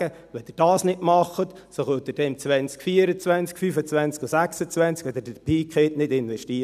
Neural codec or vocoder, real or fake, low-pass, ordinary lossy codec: none; real; 14.4 kHz; none